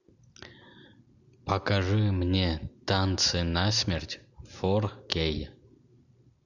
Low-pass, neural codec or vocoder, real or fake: 7.2 kHz; none; real